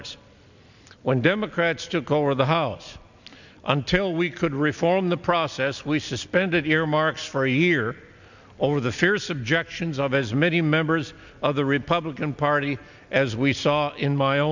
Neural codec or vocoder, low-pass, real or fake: none; 7.2 kHz; real